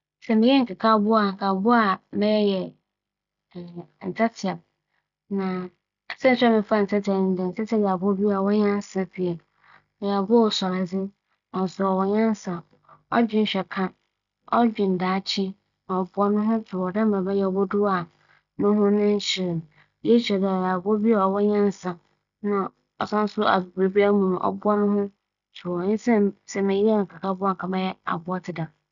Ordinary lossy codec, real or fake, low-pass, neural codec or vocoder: MP3, 64 kbps; real; 7.2 kHz; none